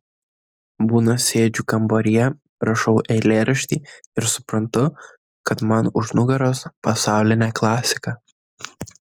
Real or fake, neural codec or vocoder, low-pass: real; none; 19.8 kHz